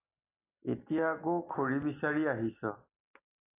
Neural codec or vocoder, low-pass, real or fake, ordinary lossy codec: none; 3.6 kHz; real; AAC, 24 kbps